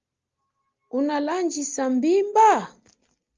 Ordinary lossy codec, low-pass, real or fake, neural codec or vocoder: Opus, 32 kbps; 7.2 kHz; real; none